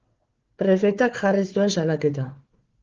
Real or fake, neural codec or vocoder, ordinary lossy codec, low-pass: fake; codec, 16 kHz, 4 kbps, FunCodec, trained on Chinese and English, 50 frames a second; Opus, 16 kbps; 7.2 kHz